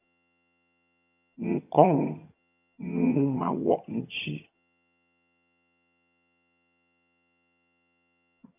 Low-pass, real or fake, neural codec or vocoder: 3.6 kHz; fake; vocoder, 22.05 kHz, 80 mel bands, HiFi-GAN